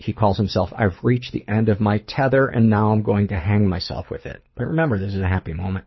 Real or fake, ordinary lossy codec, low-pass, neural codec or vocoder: fake; MP3, 24 kbps; 7.2 kHz; codec, 24 kHz, 6 kbps, HILCodec